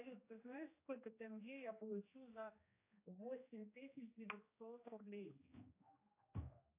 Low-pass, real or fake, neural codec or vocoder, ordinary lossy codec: 3.6 kHz; fake; codec, 16 kHz, 1 kbps, X-Codec, HuBERT features, trained on general audio; AAC, 24 kbps